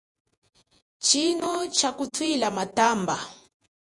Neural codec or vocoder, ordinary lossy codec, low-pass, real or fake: vocoder, 48 kHz, 128 mel bands, Vocos; Opus, 64 kbps; 10.8 kHz; fake